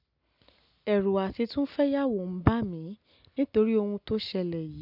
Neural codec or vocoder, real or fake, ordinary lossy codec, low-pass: none; real; none; 5.4 kHz